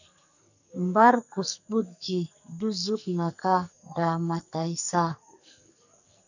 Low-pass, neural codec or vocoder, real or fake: 7.2 kHz; codec, 44.1 kHz, 2.6 kbps, SNAC; fake